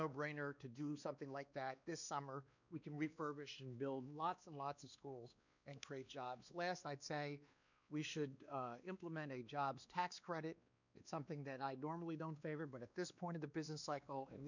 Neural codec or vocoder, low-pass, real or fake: codec, 16 kHz, 2 kbps, X-Codec, WavLM features, trained on Multilingual LibriSpeech; 7.2 kHz; fake